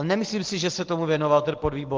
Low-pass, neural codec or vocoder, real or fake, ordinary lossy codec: 7.2 kHz; none; real; Opus, 16 kbps